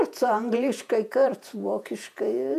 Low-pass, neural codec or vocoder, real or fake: 14.4 kHz; vocoder, 48 kHz, 128 mel bands, Vocos; fake